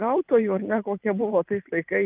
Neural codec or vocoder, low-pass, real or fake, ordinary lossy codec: vocoder, 44.1 kHz, 128 mel bands every 512 samples, BigVGAN v2; 3.6 kHz; fake; Opus, 24 kbps